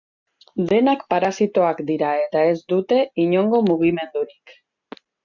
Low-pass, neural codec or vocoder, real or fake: 7.2 kHz; none; real